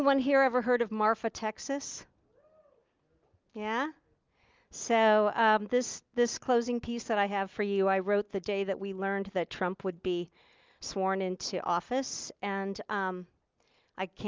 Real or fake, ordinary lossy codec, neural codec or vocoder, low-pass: real; Opus, 24 kbps; none; 7.2 kHz